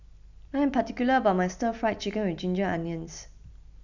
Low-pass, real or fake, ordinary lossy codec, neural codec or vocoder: 7.2 kHz; real; none; none